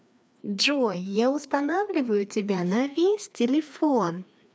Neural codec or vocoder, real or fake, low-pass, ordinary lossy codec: codec, 16 kHz, 2 kbps, FreqCodec, larger model; fake; none; none